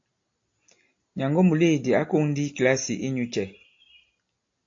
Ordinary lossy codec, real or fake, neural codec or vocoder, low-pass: AAC, 48 kbps; real; none; 7.2 kHz